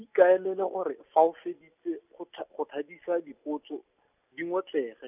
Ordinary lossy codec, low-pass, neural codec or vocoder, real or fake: none; 3.6 kHz; none; real